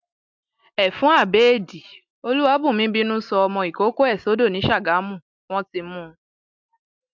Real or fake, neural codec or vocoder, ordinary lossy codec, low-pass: real; none; MP3, 64 kbps; 7.2 kHz